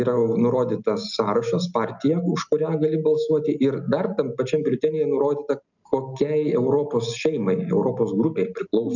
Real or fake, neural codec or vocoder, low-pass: real; none; 7.2 kHz